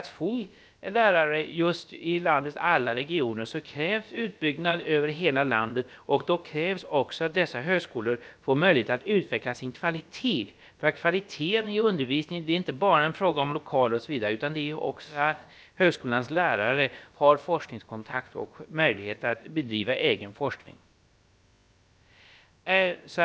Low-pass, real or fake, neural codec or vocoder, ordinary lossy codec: none; fake; codec, 16 kHz, about 1 kbps, DyCAST, with the encoder's durations; none